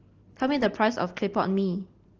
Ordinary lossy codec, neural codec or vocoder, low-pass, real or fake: Opus, 16 kbps; none; 7.2 kHz; real